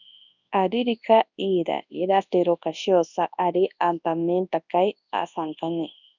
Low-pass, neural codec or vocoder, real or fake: 7.2 kHz; codec, 24 kHz, 0.9 kbps, WavTokenizer, large speech release; fake